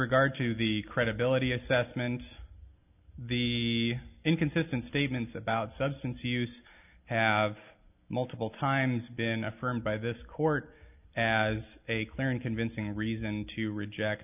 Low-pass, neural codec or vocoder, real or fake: 3.6 kHz; none; real